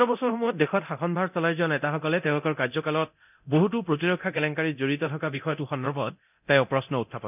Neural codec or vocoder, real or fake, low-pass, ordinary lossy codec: codec, 24 kHz, 0.9 kbps, DualCodec; fake; 3.6 kHz; none